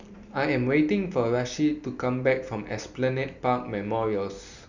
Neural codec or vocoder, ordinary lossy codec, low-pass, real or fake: none; Opus, 64 kbps; 7.2 kHz; real